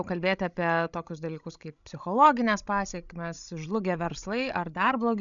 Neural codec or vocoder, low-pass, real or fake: codec, 16 kHz, 16 kbps, FreqCodec, larger model; 7.2 kHz; fake